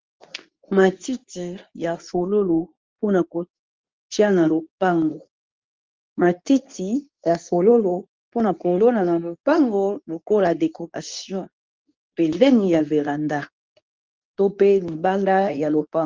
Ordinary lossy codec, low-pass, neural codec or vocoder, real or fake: Opus, 32 kbps; 7.2 kHz; codec, 24 kHz, 0.9 kbps, WavTokenizer, medium speech release version 2; fake